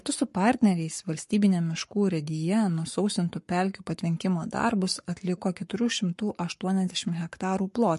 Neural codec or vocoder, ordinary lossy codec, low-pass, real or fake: codec, 44.1 kHz, 7.8 kbps, Pupu-Codec; MP3, 48 kbps; 14.4 kHz; fake